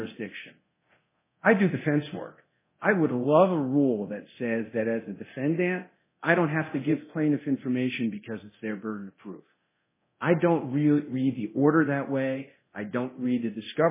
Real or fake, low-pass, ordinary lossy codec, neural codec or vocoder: fake; 3.6 kHz; MP3, 16 kbps; codec, 24 kHz, 0.9 kbps, DualCodec